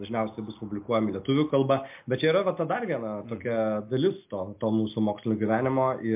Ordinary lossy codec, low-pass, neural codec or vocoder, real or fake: MP3, 32 kbps; 3.6 kHz; none; real